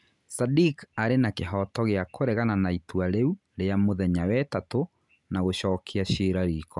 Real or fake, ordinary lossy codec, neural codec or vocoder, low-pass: real; none; none; 10.8 kHz